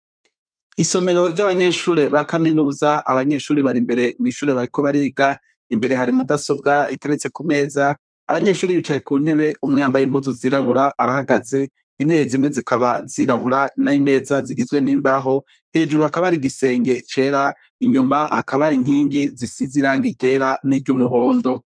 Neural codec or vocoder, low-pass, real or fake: codec, 24 kHz, 1 kbps, SNAC; 9.9 kHz; fake